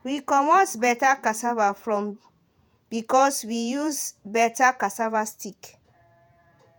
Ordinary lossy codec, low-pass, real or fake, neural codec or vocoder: none; none; fake; vocoder, 48 kHz, 128 mel bands, Vocos